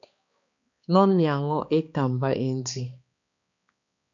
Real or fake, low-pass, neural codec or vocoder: fake; 7.2 kHz; codec, 16 kHz, 2 kbps, X-Codec, HuBERT features, trained on balanced general audio